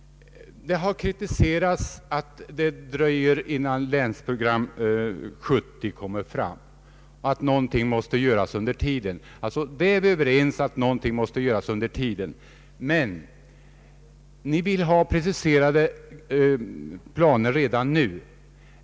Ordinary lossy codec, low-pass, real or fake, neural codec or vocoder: none; none; real; none